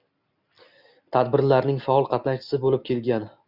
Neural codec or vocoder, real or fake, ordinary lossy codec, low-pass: none; real; Opus, 64 kbps; 5.4 kHz